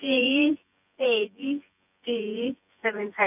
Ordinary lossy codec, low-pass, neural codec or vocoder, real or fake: none; 3.6 kHz; vocoder, 24 kHz, 100 mel bands, Vocos; fake